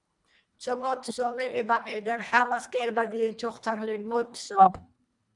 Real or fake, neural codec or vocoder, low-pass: fake; codec, 24 kHz, 1.5 kbps, HILCodec; 10.8 kHz